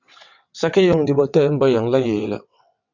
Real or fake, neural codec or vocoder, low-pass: fake; vocoder, 22.05 kHz, 80 mel bands, WaveNeXt; 7.2 kHz